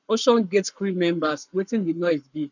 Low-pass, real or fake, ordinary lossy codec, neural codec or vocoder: 7.2 kHz; fake; none; codec, 44.1 kHz, 7.8 kbps, Pupu-Codec